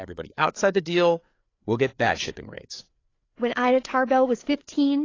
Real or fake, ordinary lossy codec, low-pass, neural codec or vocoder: fake; AAC, 32 kbps; 7.2 kHz; codec, 16 kHz, 4 kbps, FreqCodec, larger model